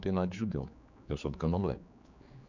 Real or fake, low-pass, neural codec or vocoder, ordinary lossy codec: fake; 7.2 kHz; codec, 16 kHz, 4 kbps, X-Codec, HuBERT features, trained on general audio; none